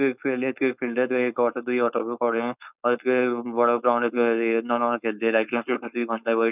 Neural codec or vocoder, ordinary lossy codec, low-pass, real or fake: codec, 16 kHz, 4.8 kbps, FACodec; none; 3.6 kHz; fake